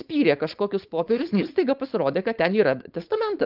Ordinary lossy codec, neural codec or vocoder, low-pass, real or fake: Opus, 24 kbps; codec, 16 kHz, 4.8 kbps, FACodec; 5.4 kHz; fake